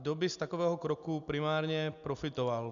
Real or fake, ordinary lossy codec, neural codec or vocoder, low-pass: real; MP3, 96 kbps; none; 7.2 kHz